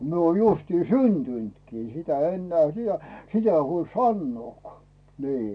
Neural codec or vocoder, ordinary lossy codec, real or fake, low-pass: none; none; real; 9.9 kHz